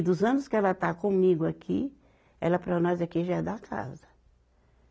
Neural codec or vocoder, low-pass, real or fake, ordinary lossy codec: none; none; real; none